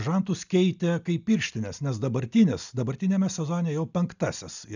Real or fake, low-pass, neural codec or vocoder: real; 7.2 kHz; none